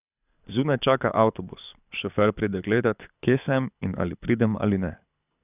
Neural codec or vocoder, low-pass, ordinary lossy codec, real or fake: codec, 24 kHz, 6 kbps, HILCodec; 3.6 kHz; none; fake